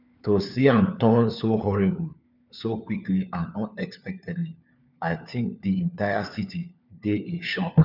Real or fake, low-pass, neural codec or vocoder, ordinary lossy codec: fake; 5.4 kHz; codec, 16 kHz, 4 kbps, FunCodec, trained on LibriTTS, 50 frames a second; none